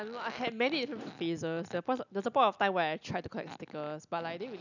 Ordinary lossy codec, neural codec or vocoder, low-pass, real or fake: none; none; 7.2 kHz; real